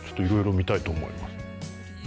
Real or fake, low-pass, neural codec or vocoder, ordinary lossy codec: real; none; none; none